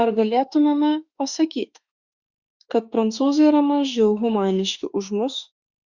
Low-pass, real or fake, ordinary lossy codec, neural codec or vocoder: 7.2 kHz; fake; Opus, 64 kbps; autoencoder, 48 kHz, 32 numbers a frame, DAC-VAE, trained on Japanese speech